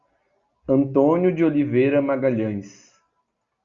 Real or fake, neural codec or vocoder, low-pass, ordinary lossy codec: real; none; 7.2 kHz; Opus, 64 kbps